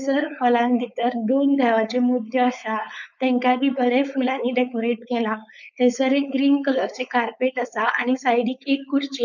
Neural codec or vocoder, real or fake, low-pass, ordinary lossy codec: codec, 16 kHz, 4.8 kbps, FACodec; fake; 7.2 kHz; none